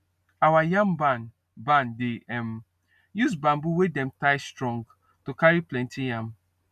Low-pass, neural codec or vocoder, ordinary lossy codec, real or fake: 14.4 kHz; none; none; real